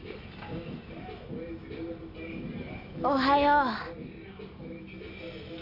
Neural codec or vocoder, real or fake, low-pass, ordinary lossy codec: none; real; 5.4 kHz; none